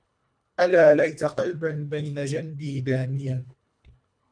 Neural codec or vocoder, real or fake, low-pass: codec, 24 kHz, 1.5 kbps, HILCodec; fake; 9.9 kHz